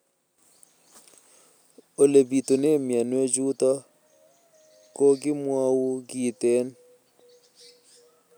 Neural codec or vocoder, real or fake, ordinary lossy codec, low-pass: none; real; none; none